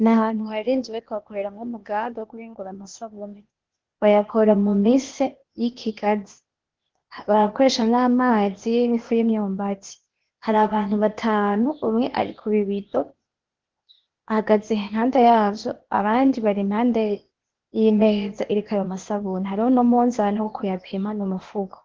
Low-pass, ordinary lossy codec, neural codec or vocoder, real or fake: 7.2 kHz; Opus, 16 kbps; codec, 16 kHz, 0.8 kbps, ZipCodec; fake